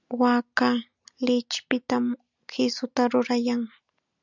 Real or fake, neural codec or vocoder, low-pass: real; none; 7.2 kHz